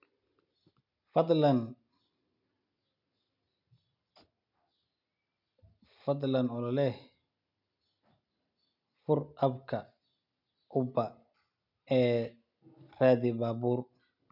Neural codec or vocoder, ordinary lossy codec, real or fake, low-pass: none; none; real; 5.4 kHz